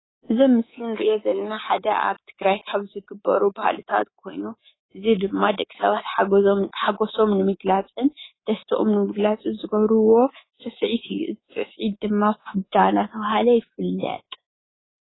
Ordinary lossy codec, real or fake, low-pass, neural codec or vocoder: AAC, 16 kbps; fake; 7.2 kHz; codec, 24 kHz, 3.1 kbps, DualCodec